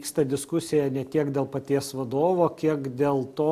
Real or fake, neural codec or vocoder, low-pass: real; none; 14.4 kHz